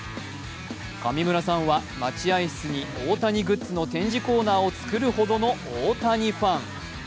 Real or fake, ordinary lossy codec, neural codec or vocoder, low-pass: real; none; none; none